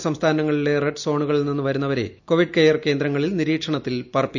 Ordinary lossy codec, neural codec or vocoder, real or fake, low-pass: none; none; real; 7.2 kHz